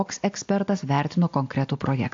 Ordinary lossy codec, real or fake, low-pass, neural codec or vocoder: AAC, 48 kbps; real; 7.2 kHz; none